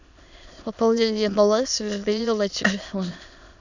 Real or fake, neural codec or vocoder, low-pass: fake; autoencoder, 22.05 kHz, a latent of 192 numbers a frame, VITS, trained on many speakers; 7.2 kHz